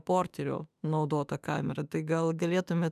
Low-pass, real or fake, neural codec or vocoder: 14.4 kHz; fake; autoencoder, 48 kHz, 32 numbers a frame, DAC-VAE, trained on Japanese speech